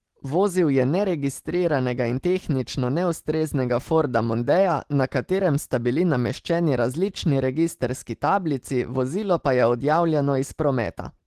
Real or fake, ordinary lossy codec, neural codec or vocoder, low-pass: real; Opus, 16 kbps; none; 14.4 kHz